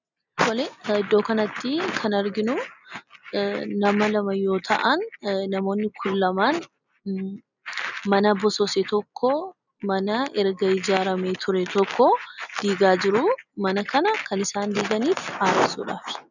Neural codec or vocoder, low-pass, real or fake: none; 7.2 kHz; real